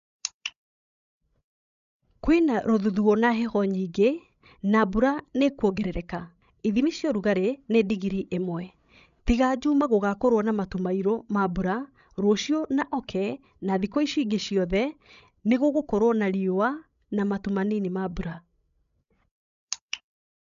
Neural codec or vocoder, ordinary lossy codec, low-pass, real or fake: codec, 16 kHz, 16 kbps, FreqCodec, larger model; none; 7.2 kHz; fake